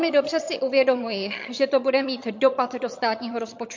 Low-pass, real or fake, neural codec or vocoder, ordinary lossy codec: 7.2 kHz; fake; vocoder, 22.05 kHz, 80 mel bands, HiFi-GAN; MP3, 48 kbps